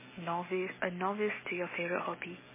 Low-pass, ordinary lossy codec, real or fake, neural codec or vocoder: 3.6 kHz; MP3, 16 kbps; real; none